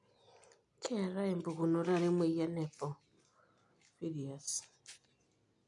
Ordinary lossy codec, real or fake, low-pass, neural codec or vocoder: AAC, 64 kbps; real; 10.8 kHz; none